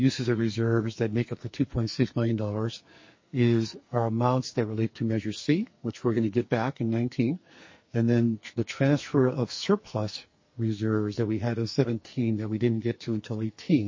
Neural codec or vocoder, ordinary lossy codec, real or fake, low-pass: codec, 44.1 kHz, 2.6 kbps, SNAC; MP3, 32 kbps; fake; 7.2 kHz